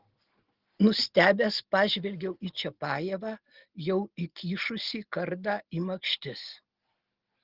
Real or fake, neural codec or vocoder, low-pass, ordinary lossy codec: real; none; 5.4 kHz; Opus, 16 kbps